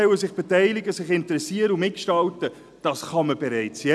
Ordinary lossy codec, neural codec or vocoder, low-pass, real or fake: none; none; none; real